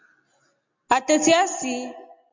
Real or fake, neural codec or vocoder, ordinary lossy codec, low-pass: real; none; MP3, 48 kbps; 7.2 kHz